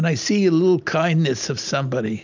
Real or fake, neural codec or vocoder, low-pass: real; none; 7.2 kHz